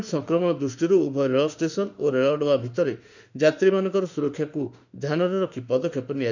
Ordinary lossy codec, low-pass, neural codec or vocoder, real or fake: none; 7.2 kHz; autoencoder, 48 kHz, 32 numbers a frame, DAC-VAE, trained on Japanese speech; fake